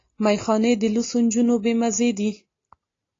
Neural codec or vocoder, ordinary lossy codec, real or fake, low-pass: none; AAC, 32 kbps; real; 7.2 kHz